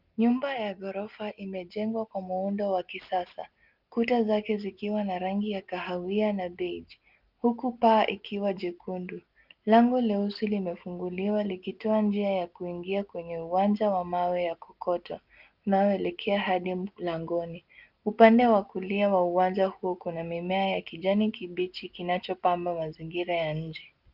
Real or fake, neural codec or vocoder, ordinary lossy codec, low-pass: real; none; Opus, 32 kbps; 5.4 kHz